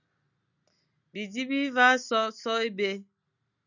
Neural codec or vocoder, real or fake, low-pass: none; real; 7.2 kHz